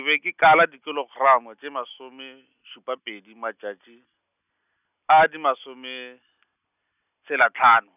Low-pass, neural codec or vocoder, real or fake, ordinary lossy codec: 3.6 kHz; none; real; none